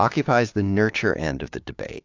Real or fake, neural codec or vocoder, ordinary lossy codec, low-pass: fake; codec, 16 kHz, 6 kbps, DAC; AAC, 48 kbps; 7.2 kHz